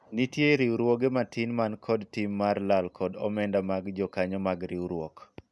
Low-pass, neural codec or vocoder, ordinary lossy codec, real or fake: none; none; none; real